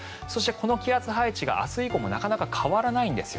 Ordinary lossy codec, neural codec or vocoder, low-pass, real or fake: none; none; none; real